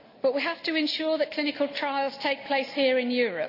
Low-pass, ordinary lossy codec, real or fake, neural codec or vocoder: 5.4 kHz; none; real; none